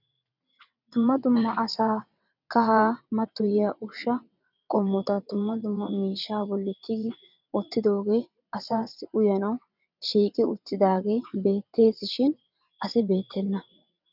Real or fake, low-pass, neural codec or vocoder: fake; 5.4 kHz; vocoder, 22.05 kHz, 80 mel bands, WaveNeXt